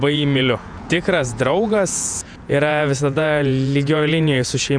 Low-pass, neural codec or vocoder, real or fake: 9.9 kHz; vocoder, 48 kHz, 128 mel bands, Vocos; fake